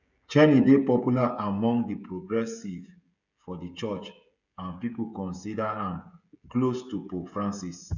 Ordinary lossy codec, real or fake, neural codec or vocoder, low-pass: none; fake; codec, 16 kHz, 16 kbps, FreqCodec, smaller model; 7.2 kHz